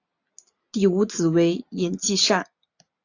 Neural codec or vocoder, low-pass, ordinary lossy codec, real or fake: none; 7.2 kHz; AAC, 48 kbps; real